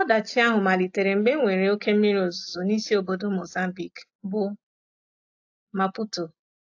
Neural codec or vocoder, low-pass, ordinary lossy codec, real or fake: none; 7.2 kHz; AAC, 48 kbps; real